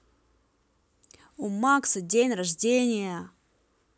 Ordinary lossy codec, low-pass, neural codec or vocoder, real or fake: none; none; none; real